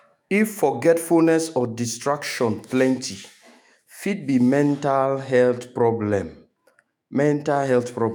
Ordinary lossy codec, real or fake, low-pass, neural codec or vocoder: none; fake; none; autoencoder, 48 kHz, 128 numbers a frame, DAC-VAE, trained on Japanese speech